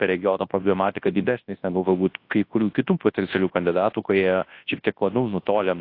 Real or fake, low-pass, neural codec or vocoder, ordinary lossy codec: fake; 5.4 kHz; codec, 24 kHz, 0.9 kbps, WavTokenizer, large speech release; AAC, 32 kbps